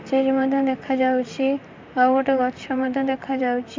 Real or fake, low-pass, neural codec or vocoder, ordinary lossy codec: fake; 7.2 kHz; vocoder, 44.1 kHz, 128 mel bands, Pupu-Vocoder; MP3, 64 kbps